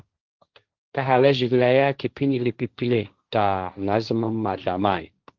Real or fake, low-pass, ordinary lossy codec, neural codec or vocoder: fake; 7.2 kHz; Opus, 32 kbps; codec, 16 kHz, 1.1 kbps, Voila-Tokenizer